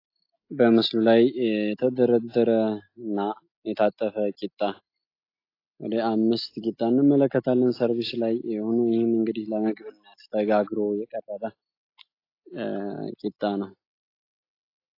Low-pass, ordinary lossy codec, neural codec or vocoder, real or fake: 5.4 kHz; AAC, 32 kbps; none; real